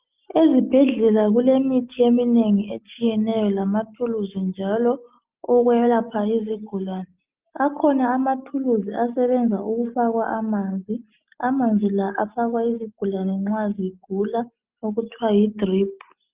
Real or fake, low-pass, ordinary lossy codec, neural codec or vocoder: real; 3.6 kHz; Opus, 24 kbps; none